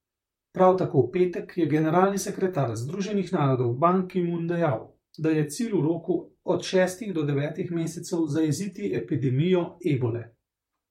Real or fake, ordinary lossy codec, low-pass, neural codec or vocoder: fake; MP3, 64 kbps; 19.8 kHz; vocoder, 44.1 kHz, 128 mel bands, Pupu-Vocoder